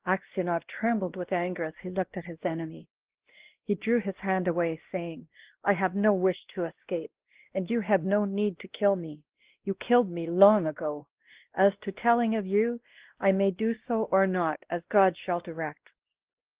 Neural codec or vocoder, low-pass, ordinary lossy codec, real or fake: codec, 16 kHz, 1 kbps, X-Codec, WavLM features, trained on Multilingual LibriSpeech; 3.6 kHz; Opus, 16 kbps; fake